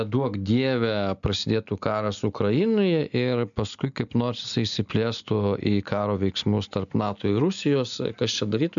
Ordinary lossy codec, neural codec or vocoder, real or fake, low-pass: MP3, 96 kbps; none; real; 7.2 kHz